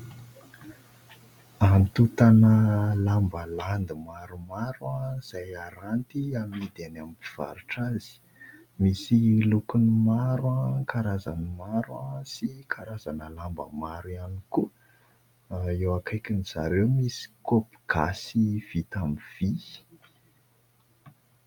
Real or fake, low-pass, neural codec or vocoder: real; 19.8 kHz; none